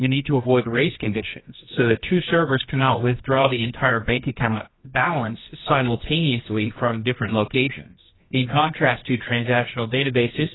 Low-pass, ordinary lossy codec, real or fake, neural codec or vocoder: 7.2 kHz; AAC, 16 kbps; fake; codec, 24 kHz, 0.9 kbps, WavTokenizer, medium music audio release